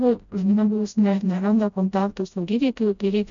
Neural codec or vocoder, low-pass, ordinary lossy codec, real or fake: codec, 16 kHz, 0.5 kbps, FreqCodec, smaller model; 7.2 kHz; MP3, 64 kbps; fake